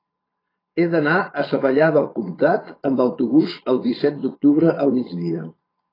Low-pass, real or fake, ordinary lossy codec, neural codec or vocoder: 5.4 kHz; fake; AAC, 24 kbps; vocoder, 22.05 kHz, 80 mel bands, Vocos